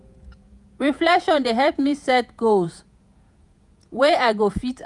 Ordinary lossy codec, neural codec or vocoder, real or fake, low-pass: none; vocoder, 48 kHz, 128 mel bands, Vocos; fake; 10.8 kHz